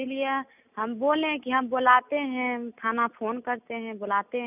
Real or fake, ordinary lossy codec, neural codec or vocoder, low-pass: real; none; none; 3.6 kHz